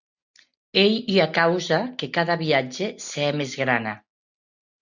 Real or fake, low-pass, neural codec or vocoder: real; 7.2 kHz; none